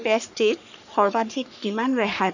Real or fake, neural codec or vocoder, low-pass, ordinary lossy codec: fake; codec, 44.1 kHz, 3.4 kbps, Pupu-Codec; 7.2 kHz; none